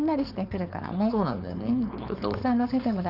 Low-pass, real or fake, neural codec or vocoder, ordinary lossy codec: 5.4 kHz; fake; codec, 16 kHz, 4.8 kbps, FACodec; none